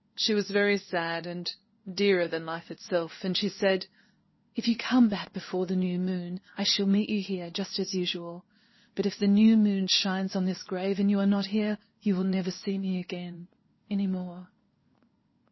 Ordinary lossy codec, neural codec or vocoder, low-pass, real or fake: MP3, 24 kbps; codec, 16 kHz in and 24 kHz out, 1 kbps, XY-Tokenizer; 7.2 kHz; fake